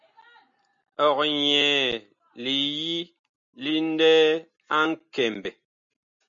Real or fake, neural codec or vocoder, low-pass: real; none; 7.2 kHz